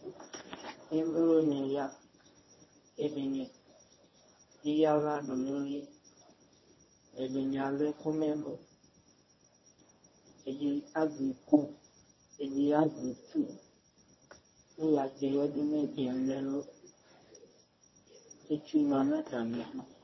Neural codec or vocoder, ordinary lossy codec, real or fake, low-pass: codec, 24 kHz, 0.9 kbps, WavTokenizer, medium speech release version 1; MP3, 24 kbps; fake; 7.2 kHz